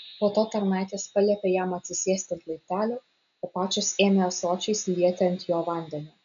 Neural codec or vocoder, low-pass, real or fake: none; 7.2 kHz; real